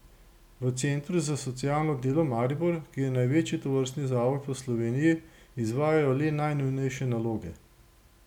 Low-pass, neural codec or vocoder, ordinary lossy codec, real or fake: 19.8 kHz; none; none; real